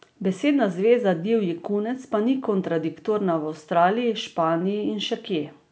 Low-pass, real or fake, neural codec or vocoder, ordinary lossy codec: none; real; none; none